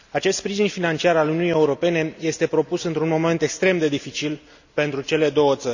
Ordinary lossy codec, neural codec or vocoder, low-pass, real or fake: none; none; 7.2 kHz; real